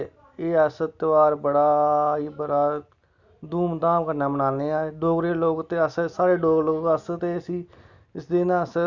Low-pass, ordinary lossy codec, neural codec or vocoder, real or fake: 7.2 kHz; none; none; real